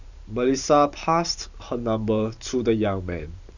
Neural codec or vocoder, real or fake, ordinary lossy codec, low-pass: vocoder, 44.1 kHz, 128 mel bands every 512 samples, BigVGAN v2; fake; none; 7.2 kHz